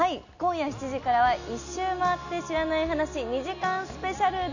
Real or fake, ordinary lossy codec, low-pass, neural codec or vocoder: real; none; 7.2 kHz; none